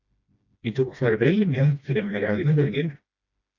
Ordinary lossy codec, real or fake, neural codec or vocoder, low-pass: AAC, 48 kbps; fake; codec, 16 kHz, 1 kbps, FreqCodec, smaller model; 7.2 kHz